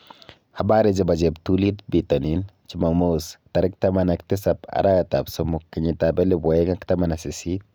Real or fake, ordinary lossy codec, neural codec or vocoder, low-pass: real; none; none; none